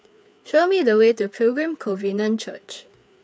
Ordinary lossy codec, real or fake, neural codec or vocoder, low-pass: none; fake; codec, 16 kHz, 4 kbps, FreqCodec, larger model; none